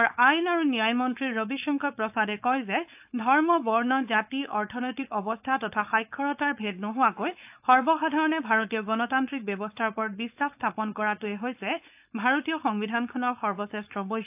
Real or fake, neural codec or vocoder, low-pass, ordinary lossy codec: fake; codec, 16 kHz, 4.8 kbps, FACodec; 3.6 kHz; AAC, 32 kbps